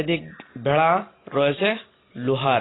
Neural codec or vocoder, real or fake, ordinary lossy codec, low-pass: none; real; AAC, 16 kbps; 7.2 kHz